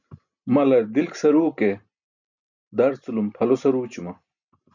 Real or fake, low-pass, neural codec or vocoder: real; 7.2 kHz; none